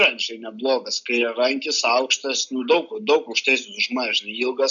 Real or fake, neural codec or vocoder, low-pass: real; none; 7.2 kHz